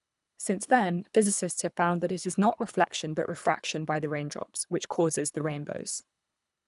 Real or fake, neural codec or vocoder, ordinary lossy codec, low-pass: fake; codec, 24 kHz, 3 kbps, HILCodec; none; 10.8 kHz